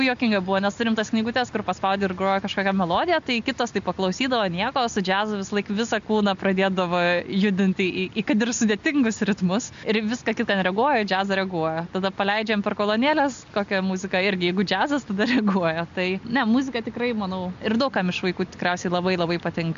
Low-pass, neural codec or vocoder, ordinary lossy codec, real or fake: 7.2 kHz; none; MP3, 64 kbps; real